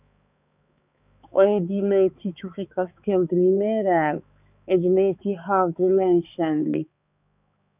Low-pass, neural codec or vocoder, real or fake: 3.6 kHz; codec, 16 kHz, 4 kbps, X-Codec, HuBERT features, trained on balanced general audio; fake